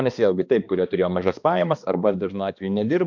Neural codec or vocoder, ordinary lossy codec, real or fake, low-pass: codec, 16 kHz, 2 kbps, X-Codec, HuBERT features, trained on balanced general audio; MP3, 64 kbps; fake; 7.2 kHz